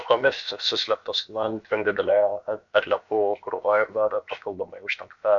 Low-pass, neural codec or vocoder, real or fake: 7.2 kHz; codec, 16 kHz, about 1 kbps, DyCAST, with the encoder's durations; fake